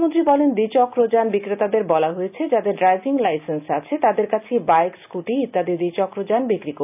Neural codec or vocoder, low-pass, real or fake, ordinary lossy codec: none; 3.6 kHz; real; none